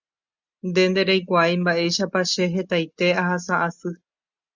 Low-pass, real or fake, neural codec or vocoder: 7.2 kHz; real; none